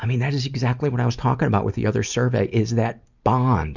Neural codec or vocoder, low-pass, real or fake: none; 7.2 kHz; real